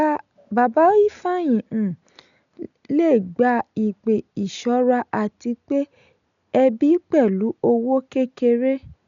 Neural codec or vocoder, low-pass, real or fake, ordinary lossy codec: none; 7.2 kHz; real; none